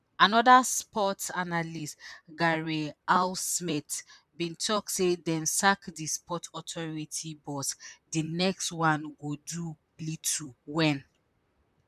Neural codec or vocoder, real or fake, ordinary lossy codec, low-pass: vocoder, 44.1 kHz, 128 mel bands, Pupu-Vocoder; fake; none; 14.4 kHz